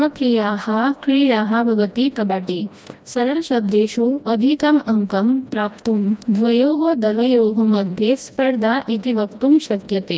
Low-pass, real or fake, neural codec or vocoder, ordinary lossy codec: none; fake; codec, 16 kHz, 1 kbps, FreqCodec, smaller model; none